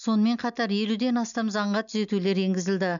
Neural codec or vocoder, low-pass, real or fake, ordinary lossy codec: none; 7.2 kHz; real; none